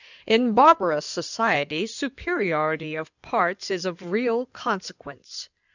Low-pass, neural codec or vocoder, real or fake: 7.2 kHz; codec, 16 kHz in and 24 kHz out, 2.2 kbps, FireRedTTS-2 codec; fake